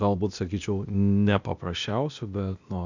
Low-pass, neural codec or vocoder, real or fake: 7.2 kHz; codec, 16 kHz, 0.8 kbps, ZipCodec; fake